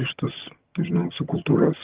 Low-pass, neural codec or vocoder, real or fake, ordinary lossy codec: 3.6 kHz; vocoder, 22.05 kHz, 80 mel bands, HiFi-GAN; fake; Opus, 16 kbps